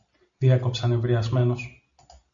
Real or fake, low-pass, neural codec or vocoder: real; 7.2 kHz; none